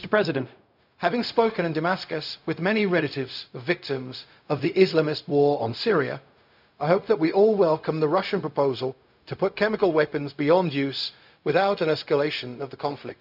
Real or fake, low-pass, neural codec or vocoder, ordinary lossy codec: fake; 5.4 kHz; codec, 16 kHz, 0.4 kbps, LongCat-Audio-Codec; none